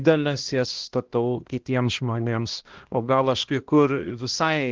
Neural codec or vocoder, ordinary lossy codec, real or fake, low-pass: codec, 16 kHz, 1 kbps, X-Codec, HuBERT features, trained on balanced general audio; Opus, 16 kbps; fake; 7.2 kHz